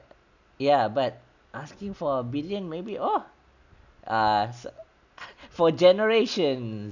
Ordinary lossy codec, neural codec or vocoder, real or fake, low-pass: none; none; real; 7.2 kHz